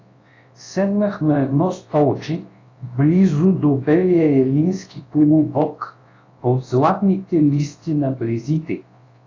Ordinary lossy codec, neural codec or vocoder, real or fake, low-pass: AAC, 32 kbps; codec, 24 kHz, 0.9 kbps, WavTokenizer, large speech release; fake; 7.2 kHz